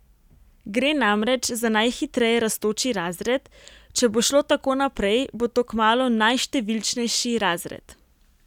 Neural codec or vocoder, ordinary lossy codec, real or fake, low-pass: none; none; real; 19.8 kHz